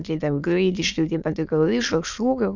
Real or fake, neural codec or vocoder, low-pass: fake; autoencoder, 22.05 kHz, a latent of 192 numbers a frame, VITS, trained on many speakers; 7.2 kHz